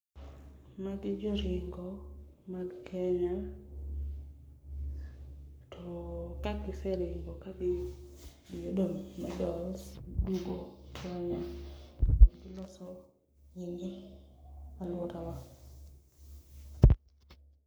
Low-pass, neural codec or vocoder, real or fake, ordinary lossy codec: none; codec, 44.1 kHz, 7.8 kbps, Pupu-Codec; fake; none